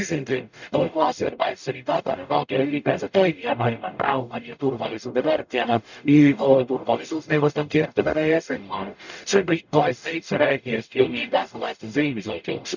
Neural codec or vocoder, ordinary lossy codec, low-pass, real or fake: codec, 44.1 kHz, 0.9 kbps, DAC; none; 7.2 kHz; fake